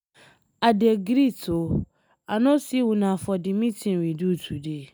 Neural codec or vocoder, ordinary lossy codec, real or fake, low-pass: none; none; real; none